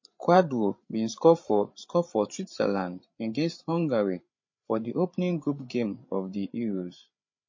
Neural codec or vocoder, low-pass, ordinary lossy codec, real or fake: codec, 16 kHz, 8 kbps, FreqCodec, larger model; 7.2 kHz; MP3, 32 kbps; fake